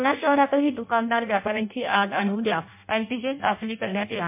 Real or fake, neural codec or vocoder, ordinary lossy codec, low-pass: fake; codec, 16 kHz in and 24 kHz out, 0.6 kbps, FireRedTTS-2 codec; MP3, 32 kbps; 3.6 kHz